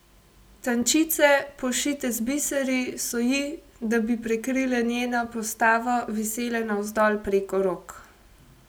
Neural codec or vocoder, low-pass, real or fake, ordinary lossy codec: none; none; real; none